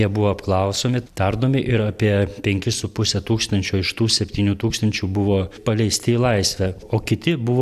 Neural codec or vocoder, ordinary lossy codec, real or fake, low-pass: none; AAC, 96 kbps; real; 14.4 kHz